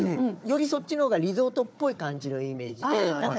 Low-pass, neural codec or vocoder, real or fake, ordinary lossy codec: none; codec, 16 kHz, 4 kbps, FunCodec, trained on Chinese and English, 50 frames a second; fake; none